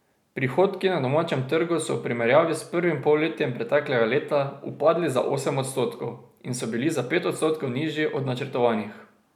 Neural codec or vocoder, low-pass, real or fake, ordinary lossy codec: none; 19.8 kHz; real; none